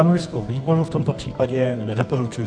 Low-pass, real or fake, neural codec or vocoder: 9.9 kHz; fake; codec, 24 kHz, 0.9 kbps, WavTokenizer, medium music audio release